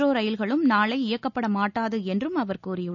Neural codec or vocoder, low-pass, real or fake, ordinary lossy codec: none; 7.2 kHz; real; none